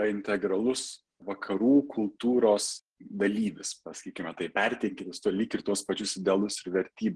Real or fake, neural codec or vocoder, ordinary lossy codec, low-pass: real; none; Opus, 16 kbps; 9.9 kHz